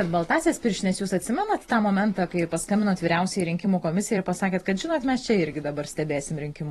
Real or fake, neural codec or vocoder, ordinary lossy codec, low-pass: fake; vocoder, 44.1 kHz, 128 mel bands every 256 samples, BigVGAN v2; AAC, 32 kbps; 19.8 kHz